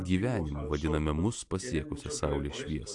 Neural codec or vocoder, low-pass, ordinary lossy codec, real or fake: none; 10.8 kHz; AAC, 64 kbps; real